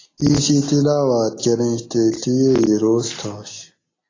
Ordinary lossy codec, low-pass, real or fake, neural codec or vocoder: AAC, 32 kbps; 7.2 kHz; real; none